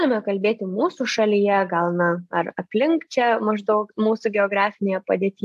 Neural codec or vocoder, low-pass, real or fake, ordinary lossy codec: none; 14.4 kHz; real; AAC, 96 kbps